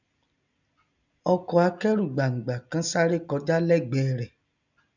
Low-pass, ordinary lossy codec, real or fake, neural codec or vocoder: 7.2 kHz; none; real; none